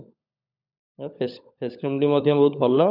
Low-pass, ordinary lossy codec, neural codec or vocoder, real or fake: 5.4 kHz; none; codec, 16 kHz, 16 kbps, FunCodec, trained on LibriTTS, 50 frames a second; fake